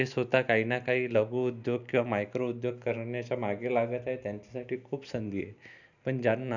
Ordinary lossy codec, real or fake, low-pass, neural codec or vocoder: none; real; 7.2 kHz; none